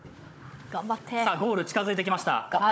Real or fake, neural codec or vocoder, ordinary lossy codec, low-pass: fake; codec, 16 kHz, 16 kbps, FunCodec, trained on Chinese and English, 50 frames a second; none; none